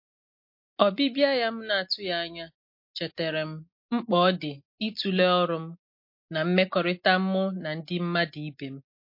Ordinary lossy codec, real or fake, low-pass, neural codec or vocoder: MP3, 32 kbps; real; 5.4 kHz; none